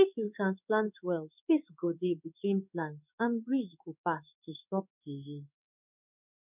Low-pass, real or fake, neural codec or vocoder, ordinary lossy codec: 3.6 kHz; fake; codec, 16 kHz in and 24 kHz out, 1 kbps, XY-Tokenizer; none